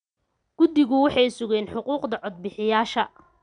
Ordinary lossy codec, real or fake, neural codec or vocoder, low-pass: none; real; none; 9.9 kHz